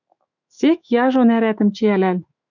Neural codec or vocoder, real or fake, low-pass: autoencoder, 48 kHz, 128 numbers a frame, DAC-VAE, trained on Japanese speech; fake; 7.2 kHz